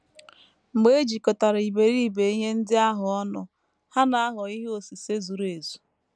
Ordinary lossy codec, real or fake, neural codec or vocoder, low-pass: none; real; none; none